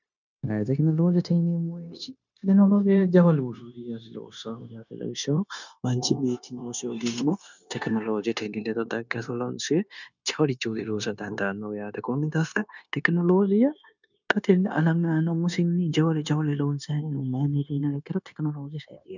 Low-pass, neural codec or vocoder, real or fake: 7.2 kHz; codec, 16 kHz, 0.9 kbps, LongCat-Audio-Codec; fake